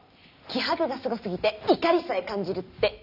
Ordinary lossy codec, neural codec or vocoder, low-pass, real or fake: none; none; 5.4 kHz; real